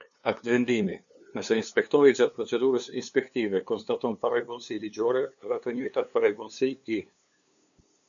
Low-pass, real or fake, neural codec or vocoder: 7.2 kHz; fake; codec, 16 kHz, 2 kbps, FunCodec, trained on LibriTTS, 25 frames a second